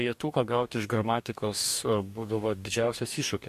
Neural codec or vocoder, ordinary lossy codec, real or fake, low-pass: codec, 44.1 kHz, 2.6 kbps, DAC; MP3, 64 kbps; fake; 14.4 kHz